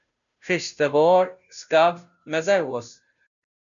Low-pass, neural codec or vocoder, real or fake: 7.2 kHz; codec, 16 kHz, 0.5 kbps, FunCodec, trained on Chinese and English, 25 frames a second; fake